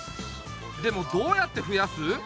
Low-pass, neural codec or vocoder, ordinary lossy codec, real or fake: none; none; none; real